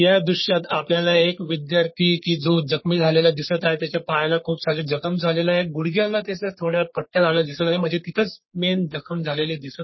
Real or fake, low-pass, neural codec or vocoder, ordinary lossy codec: fake; 7.2 kHz; codec, 44.1 kHz, 3.4 kbps, Pupu-Codec; MP3, 24 kbps